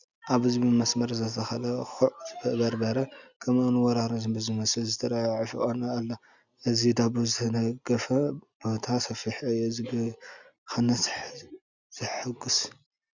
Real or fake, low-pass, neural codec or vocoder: real; 7.2 kHz; none